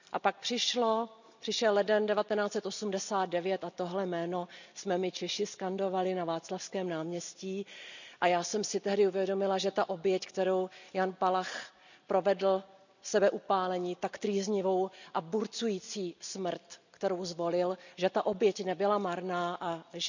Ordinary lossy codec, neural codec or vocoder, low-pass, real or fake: none; none; 7.2 kHz; real